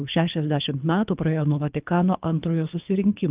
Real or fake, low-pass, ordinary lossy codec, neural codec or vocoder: fake; 3.6 kHz; Opus, 32 kbps; codec, 24 kHz, 3 kbps, HILCodec